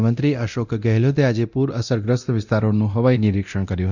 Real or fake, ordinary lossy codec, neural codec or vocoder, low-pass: fake; none; codec, 24 kHz, 0.9 kbps, DualCodec; 7.2 kHz